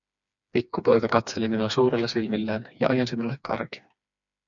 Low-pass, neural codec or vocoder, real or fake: 7.2 kHz; codec, 16 kHz, 2 kbps, FreqCodec, smaller model; fake